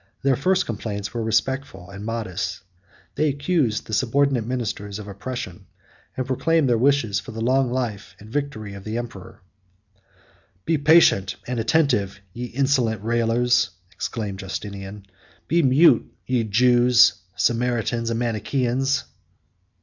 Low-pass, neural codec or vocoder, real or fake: 7.2 kHz; none; real